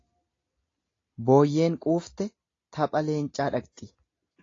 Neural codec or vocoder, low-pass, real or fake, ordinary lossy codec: none; 7.2 kHz; real; AAC, 32 kbps